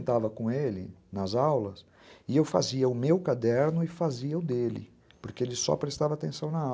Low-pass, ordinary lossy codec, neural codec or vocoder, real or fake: none; none; none; real